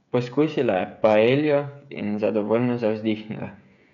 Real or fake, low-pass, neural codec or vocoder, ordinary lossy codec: fake; 7.2 kHz; codec, 16 kHz, 16 kbps, FreqCodec, smaller model; none